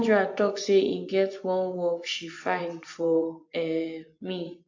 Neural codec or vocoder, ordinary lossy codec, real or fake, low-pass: vocoder, 24 kHz, 100 mel bands, Vocos; AAC, 48 kbps; fake; 7.2 kHz